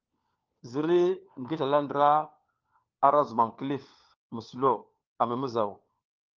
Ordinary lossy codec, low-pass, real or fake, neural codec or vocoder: Opus, 24 kbps; 7.2 kHz; fake; codec, 16 kHz, 4 kbps, FunCodec, trained on LibriTTS, 50 frames a second